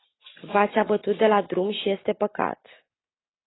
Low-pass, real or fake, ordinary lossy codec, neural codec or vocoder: 7.2 kHz; real; AAC, 16 kbps; none